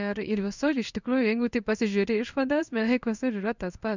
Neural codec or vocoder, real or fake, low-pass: codec, 16 kHz in and 24 kHz out, 1 kbps, XY-Tokenizer; fake; 7.2 kHz